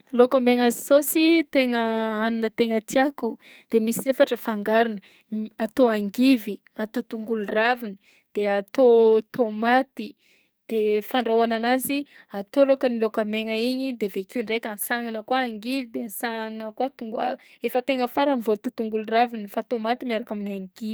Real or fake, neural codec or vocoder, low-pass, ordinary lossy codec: fake; codec, 44.1 kHz, 2.6 kbps, SNAC; none; none